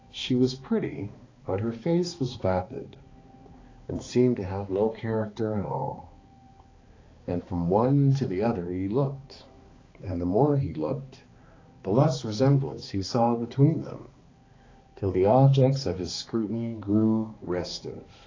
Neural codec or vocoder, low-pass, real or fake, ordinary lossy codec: codec, 16 kHz, 2 kbps, X-Codec, HuBERT features, trained on balanced general audio; 7.2 kHz; fake; AAC, 32 kbps